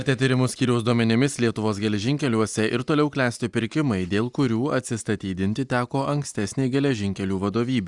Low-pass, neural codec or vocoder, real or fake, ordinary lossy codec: 10.8 kHz; none; real; Opus, 64 kbps